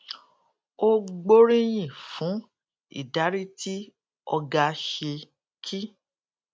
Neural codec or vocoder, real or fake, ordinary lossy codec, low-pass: none; real; none; none